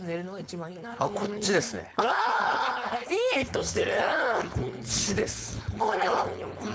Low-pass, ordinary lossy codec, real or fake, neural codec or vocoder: none; none; fake; codec, 16 kHz, 4.8 kbps, FACodec